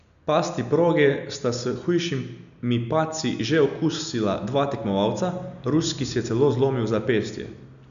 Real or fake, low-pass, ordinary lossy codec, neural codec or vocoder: real; 7.2 kHz; none; none